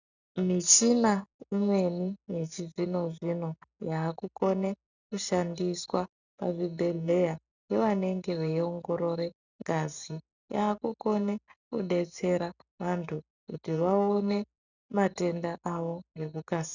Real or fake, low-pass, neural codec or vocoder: real; 7.2 kHz; none